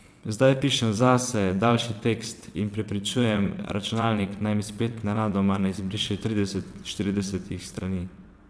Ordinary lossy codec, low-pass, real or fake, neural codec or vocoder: none; none; fake; vocoder, 22.05 kHz, 80 mel bands, WaveNeXt